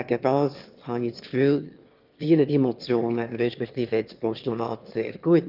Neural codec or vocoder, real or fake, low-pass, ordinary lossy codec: autoencoder, 22.05 kHz, a latent of 192 numbers a frame, VITS, trained on one speaker; fake; 5.4 kHz; Opus, 32 kbps